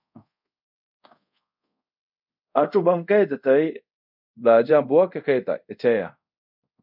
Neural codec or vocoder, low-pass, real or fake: codec, 24 kHz, 0.5 kbps, DualCodec; 5.4 kHz; fake